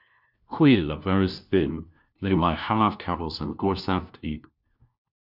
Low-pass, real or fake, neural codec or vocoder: 5.4 kHz; fake; codec, 16 kHz, 1 kbps, FunCodec, trained on LibriTTS, 50 frames a second